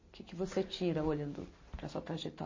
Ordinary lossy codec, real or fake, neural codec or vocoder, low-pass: MP3, 32 kbps; real; none; 7.2 kHz